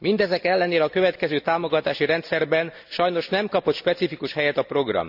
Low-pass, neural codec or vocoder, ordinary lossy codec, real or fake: 5.4 kHz; none; none; real